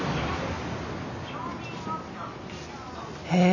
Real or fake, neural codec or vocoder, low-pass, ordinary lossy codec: real; none; 7.2 kHz; none